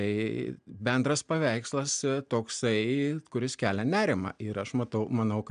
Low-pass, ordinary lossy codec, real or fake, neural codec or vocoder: 9.9 kHz; MP3, 96 kbps; real; none